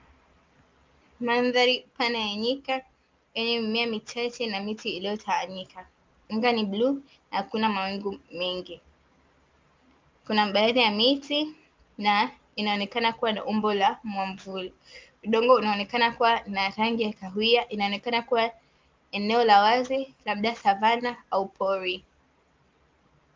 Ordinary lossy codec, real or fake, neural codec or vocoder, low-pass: Opus, 32 kbps; real; none; 7.2 kHz